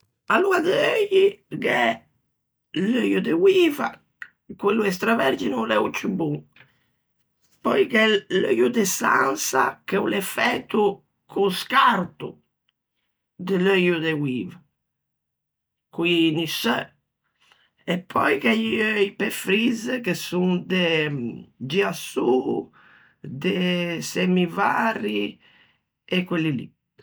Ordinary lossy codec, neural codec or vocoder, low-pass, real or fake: none; none; none; real